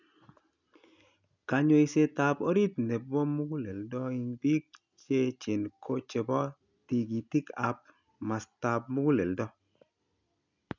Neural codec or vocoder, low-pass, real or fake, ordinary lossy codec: none; 7.2 kHz; real; none